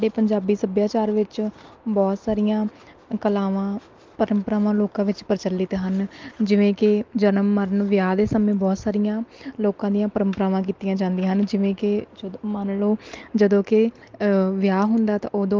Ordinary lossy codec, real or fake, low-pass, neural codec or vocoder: Opus, 32 kbps; real; 7.2 kHz; none